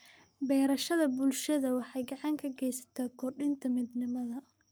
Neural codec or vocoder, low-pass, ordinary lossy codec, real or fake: codec, 44.1 kHz, 7.8 kbps, Pupu-Codec; none; none; fake